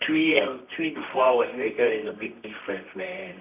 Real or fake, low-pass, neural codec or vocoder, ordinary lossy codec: fake; 3.6 kHz; codec, 24 kHz, 0.9 kbps, WavTokenizer, medium music audio release; none